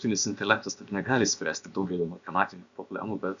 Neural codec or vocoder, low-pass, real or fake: codec, 16 kHz, about 1 kbps, DyCAST, with the encoder's durations; 7.2 kHz; fake